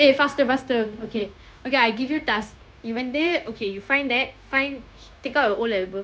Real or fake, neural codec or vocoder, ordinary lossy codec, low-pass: fake; codec, 16 kHz, 0.9 kbps, LongCat-Audio-Codec; none; none